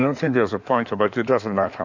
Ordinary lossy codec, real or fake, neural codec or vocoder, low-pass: MP3, 64 kbps; fake; codec, 16 kHz in and 24 kHz out, 2.2 kbps, FireRedTTS-2 codec; 7.2 kHz